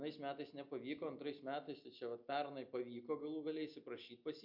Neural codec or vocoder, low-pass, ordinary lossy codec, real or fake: none; 5.4 kHz; MP3, 48 kbps; real